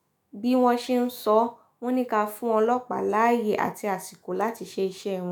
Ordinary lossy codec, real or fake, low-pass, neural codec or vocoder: none; fake; none; autoencoder, 48 kHz, 128 numbers a frame, DAC-VAE, trained on Japanese speech